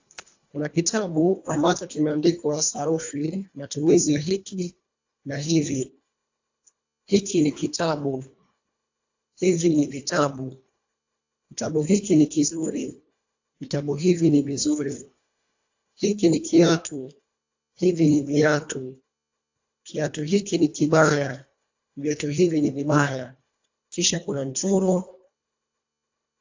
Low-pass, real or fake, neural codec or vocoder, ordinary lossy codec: 7.2 kHz; fake; codec, 24 kHz, 1.5 kbps, HILCodec; AAC, 48 kbps